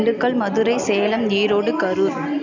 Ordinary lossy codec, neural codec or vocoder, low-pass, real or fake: MP3, 64 kbps; none; 7.2 kHz; real